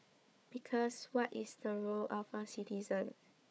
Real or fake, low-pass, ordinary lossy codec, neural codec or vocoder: fake; none; none; codec, 16 kHz, 4 kbps, FunCodec, trained on Chinese and English, 50 frames a second